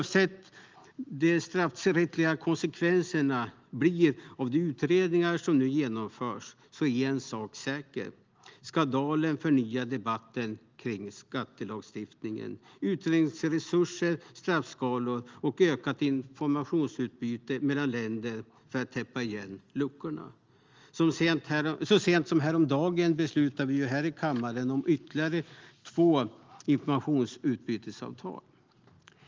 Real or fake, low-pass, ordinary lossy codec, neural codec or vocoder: real; 7.2 kHz; Opus, 32 kbps; none